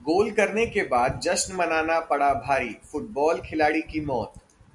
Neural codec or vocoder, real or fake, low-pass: none; real; 10.8 kHz